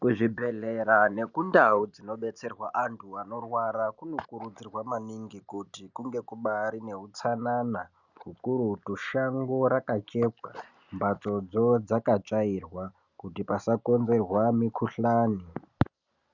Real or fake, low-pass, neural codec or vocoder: real; 7.2 kHz; none